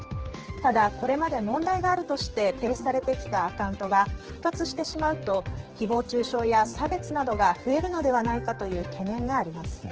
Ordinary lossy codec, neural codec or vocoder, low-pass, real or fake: Opus, 16 kbps; codec, 16 kHz, 4 kbps, X-Codec, HuBERT features, trained on general audio; 7.2 kHz; fake